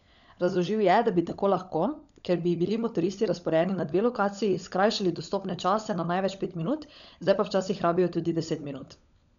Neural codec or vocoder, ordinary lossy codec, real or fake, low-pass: codec, 16 kHz, 16 kbps, FunCodec, trained on LibriTTS, 50 frames a second; none; fake; 7.2 kHz